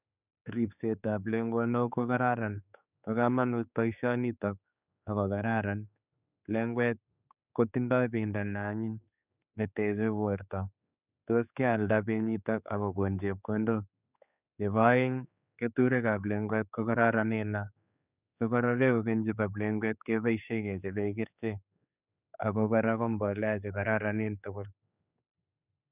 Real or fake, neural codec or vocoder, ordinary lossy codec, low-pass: fake; codec, 16 kHz, 4 kbps, X-Codec, HuBERT features, trained on general audio; none; 3.6 kHz